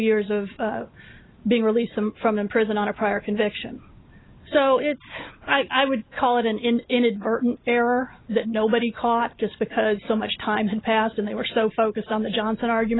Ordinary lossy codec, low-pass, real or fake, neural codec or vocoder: AAC, 16 kbps; 7.2 kHz; real; none